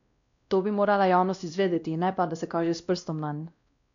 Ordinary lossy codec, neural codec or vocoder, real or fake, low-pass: none; codec, 16 kHz, 1 kbps, X-Codec, WavLM features, trained on Multilingual LibriSpeech; fake; 7.2 kHz